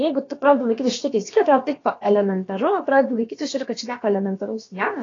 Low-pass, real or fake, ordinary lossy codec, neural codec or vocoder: 7.2 kHz; fake; AAC, 32 kbps; codec, 16 kHz, about 1 kbps, DyCAST, with the encoder's durations